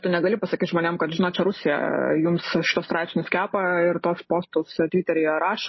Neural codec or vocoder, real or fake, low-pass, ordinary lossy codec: none; real; 7.2 kHz; MP3, 24 kbps